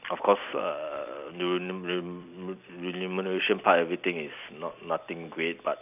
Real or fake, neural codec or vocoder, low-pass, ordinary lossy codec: fake; vocoder, 44.1 kHz, 128 mel bands every 256 samples, BigVGAN v2; 3.6 kHz; none